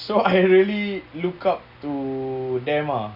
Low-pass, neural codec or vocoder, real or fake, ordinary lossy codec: 5.4 kHz; none; real; Opus, 64 kbps